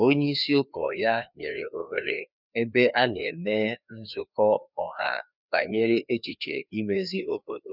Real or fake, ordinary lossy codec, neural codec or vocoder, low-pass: fake; none; codec, 16 kHz, 2 kbps, FreqCodec, larger model; 5.4 kHz